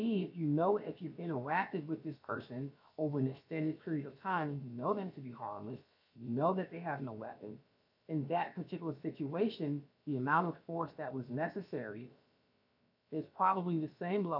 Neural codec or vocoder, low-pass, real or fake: codec, 16 kHz, about 1 kbps, DyCAST, with the encoder's durations; 5.4 kHz; fake